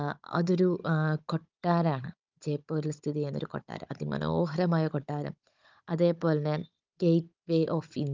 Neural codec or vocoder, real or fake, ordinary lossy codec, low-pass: codec, 16 kHz, 16 kbps, FunCodec, trained on Chinese and English, 50 frames a second; fake; Opus, 24 kbps; 7.2 kHz